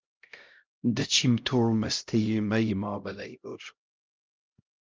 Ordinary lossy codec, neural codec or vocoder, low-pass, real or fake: Opus, 32 kbps; codec, 16 kHz, 0.5 kbps, X-Codec, HuBERT features, trained on LibriSpeech; 7.2 kHz; fake